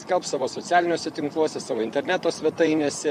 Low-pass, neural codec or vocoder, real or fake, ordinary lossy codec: 14.4 kHz; vocoder, 44.1 kHz, 128 mel bands, Pupu-Vocoder; fake; MP3, 96 kbps